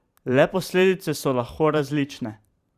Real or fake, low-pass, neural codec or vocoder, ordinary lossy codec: fake; 14.4 kHz; vocoder, 44.1 kHz, 128 mel bands every 512 samples, BigVGAN v2; Opus, 64 kbps